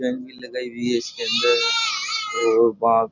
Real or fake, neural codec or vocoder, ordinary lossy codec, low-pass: real; none; none; 7.2 kHz